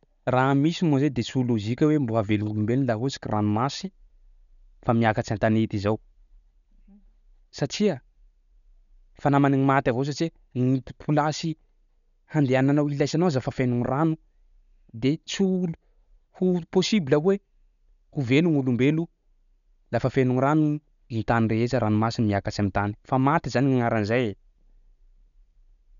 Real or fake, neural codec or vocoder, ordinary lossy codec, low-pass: real; none; none; 7.2 kHz